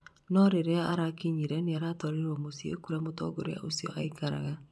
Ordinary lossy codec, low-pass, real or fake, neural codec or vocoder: none; none; fake; vocoder, 24 kHz, 100 mel bands, Vocos